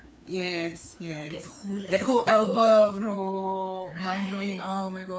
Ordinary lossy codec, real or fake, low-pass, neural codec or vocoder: none; fake; none; codec, 16 kHz, 2 kbps, FreqCodec, larger model